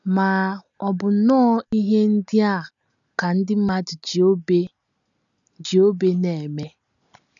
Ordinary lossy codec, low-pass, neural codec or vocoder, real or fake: none; 7.2 kHz; none; real